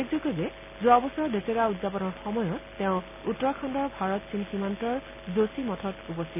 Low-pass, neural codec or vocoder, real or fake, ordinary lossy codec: 3.6 kHz; none; real; none